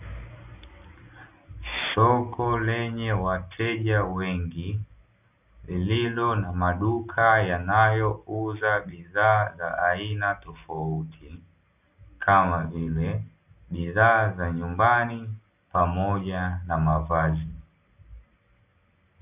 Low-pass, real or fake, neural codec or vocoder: 3.6 kHz; real; none